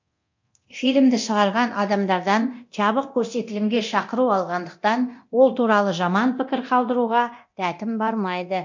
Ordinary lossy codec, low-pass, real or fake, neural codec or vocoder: MP3, 48 kbps; 7.2 kHz; fake; codec, 24 kHz, 0.9 kbps, DualCodec